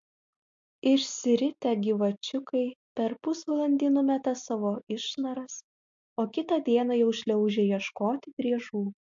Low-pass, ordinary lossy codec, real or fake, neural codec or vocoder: 7.2 kHz; MP3, 48 kbps; real; none